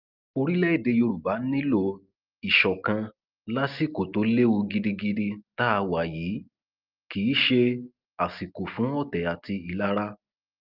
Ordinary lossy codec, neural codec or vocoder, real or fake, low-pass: Opus, 24 kbps; none; real; 5.4 kHz